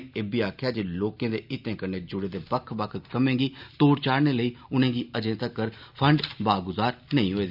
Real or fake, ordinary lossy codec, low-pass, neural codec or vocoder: real; none; 5.4 kHz; none